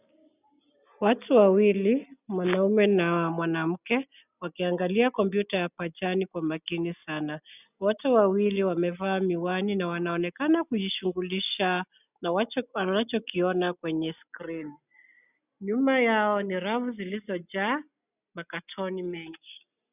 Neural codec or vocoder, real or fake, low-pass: none; real; 3.6 kHz